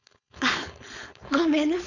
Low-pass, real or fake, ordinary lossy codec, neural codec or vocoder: 7.2 kHz; fake; none; codec, 16 kHz, 4.8 kbps, FACodec